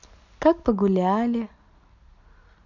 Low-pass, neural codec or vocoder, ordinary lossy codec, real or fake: 7.2 kHz; none; none; real